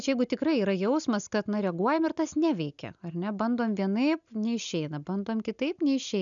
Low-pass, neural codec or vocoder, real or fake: 7.2 kHz; none; real